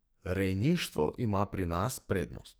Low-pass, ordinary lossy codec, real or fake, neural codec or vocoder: none; none; fake; codec, 44.1 kHz, 2.6 kbps, SNAC